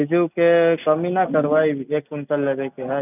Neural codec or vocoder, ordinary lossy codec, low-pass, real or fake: none; none; 3.6 kHz; real